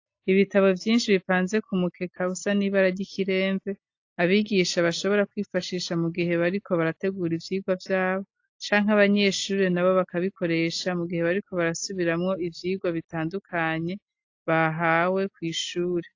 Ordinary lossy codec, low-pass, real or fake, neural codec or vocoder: AAC, 48 kbps; 7.2 kHz; real; none